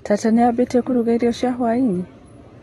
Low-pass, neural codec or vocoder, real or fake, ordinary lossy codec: 19.8 kHz; none; real; AAC, 32 kbps